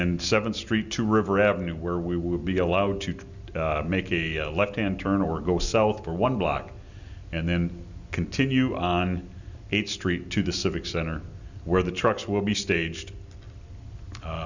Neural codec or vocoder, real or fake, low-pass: none; real; 7.2 kHz